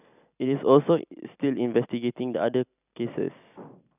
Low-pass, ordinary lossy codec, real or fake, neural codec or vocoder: 3.6 kHz; none; real; none